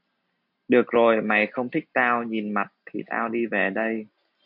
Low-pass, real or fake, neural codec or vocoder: 5.4 kHz; real; none